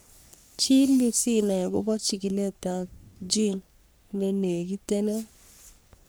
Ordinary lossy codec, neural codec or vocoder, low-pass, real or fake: none; codec, 44.1 kHz, 3.4 kbps, Pupu-Codec; none; fake